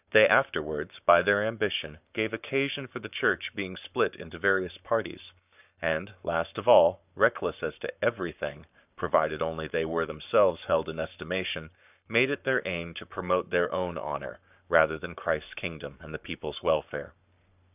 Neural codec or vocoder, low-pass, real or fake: codec, 44.1 kHz, 7.8 kbps, Pupu-Codec; 3.6 kHz; fake